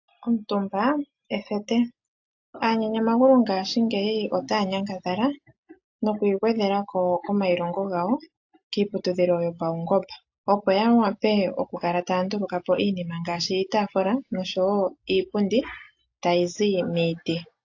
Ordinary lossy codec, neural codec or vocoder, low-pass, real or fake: AAC, 48 kbps; none; 7.2 kHz; real